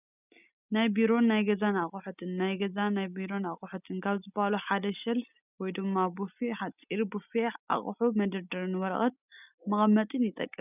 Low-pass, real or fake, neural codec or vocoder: 3.6 kHz; real; none